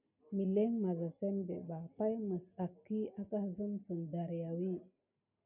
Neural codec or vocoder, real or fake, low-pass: none; real; 3.6 kHz